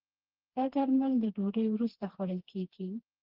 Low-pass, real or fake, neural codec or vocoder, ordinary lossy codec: 5.4 kHz; fake; codec, 16 kHz, 2 kbps, FreqCodec, smaller model; Opus, 16 kbps